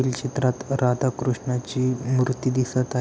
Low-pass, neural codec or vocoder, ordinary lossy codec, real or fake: none; none; none; real